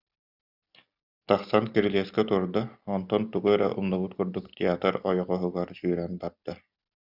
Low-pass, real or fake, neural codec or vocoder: 5.4 kHz; real; none